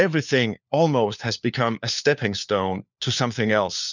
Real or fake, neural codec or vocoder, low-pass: fake; codec, 16 kHz, 4 kbps, FunCodec, trained on LibriTTS, 50 frames a second; 7.2 kHz